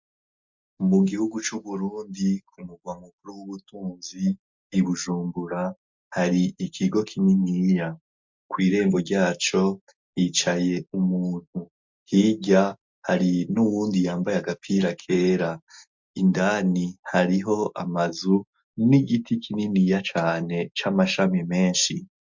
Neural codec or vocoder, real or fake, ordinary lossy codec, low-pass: none; real; MP3, 64 kbps; 7.2 kHz